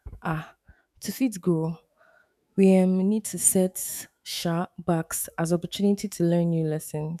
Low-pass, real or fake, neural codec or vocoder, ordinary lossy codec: 14.4 kHz; fake; autoencoder, 48 kHz, 128 numbers a frame, DAC-VAE, trained on Japanese speech; none